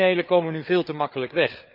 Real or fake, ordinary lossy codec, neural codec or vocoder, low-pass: fake; none; codec, 16 kHz, 4 kbps, FreqCodec, larger model; 5.4 kHz